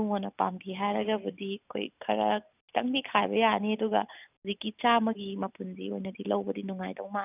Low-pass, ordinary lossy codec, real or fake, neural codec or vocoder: 3.6 kHz; none; real; none